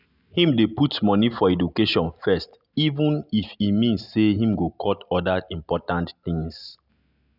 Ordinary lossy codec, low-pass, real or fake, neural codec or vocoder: none; 5.4 kHz; real; none